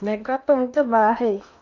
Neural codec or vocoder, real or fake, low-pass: codec, 16 kHz in and 24 kHz out, 0.8 kbps, FocalCodec, streaming, 65536 codes; fake; 7.2 kHz